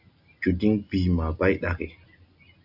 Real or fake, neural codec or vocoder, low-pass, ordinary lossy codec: real; none; 5.4 kHz; AAC, 48 kbps